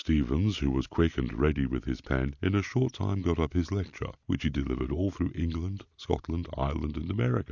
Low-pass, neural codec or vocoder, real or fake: 7.2 kHz; none; real